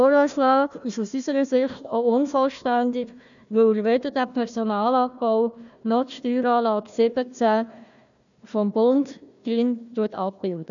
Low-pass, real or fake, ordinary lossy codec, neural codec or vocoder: 7.2 kHz; fake; none; codec, 16 kHz, 1 kbps, FunCodec, trained on Chinese and English, 50 frames a second